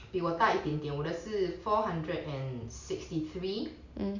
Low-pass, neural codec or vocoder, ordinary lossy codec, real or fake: 7.2 kHz; none; none; real